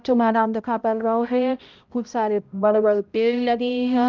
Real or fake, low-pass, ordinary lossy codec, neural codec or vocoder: fake; 7.2 kHz; Opus, 32 kbps; codec, 16 kHz, 0.5 kbps, X-Codec, HuBERT features, trained on balanced general audio